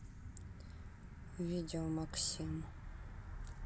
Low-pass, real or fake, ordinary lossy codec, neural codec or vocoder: none; real; none; none